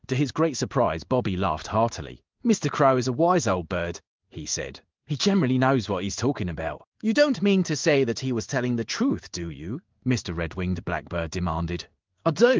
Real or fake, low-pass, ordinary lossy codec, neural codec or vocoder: fake; 7.2 kHz; Opus, 32 kbps; codec, 16 kHz in and 24 kHz out, 1 kbps, XY-Tokenizer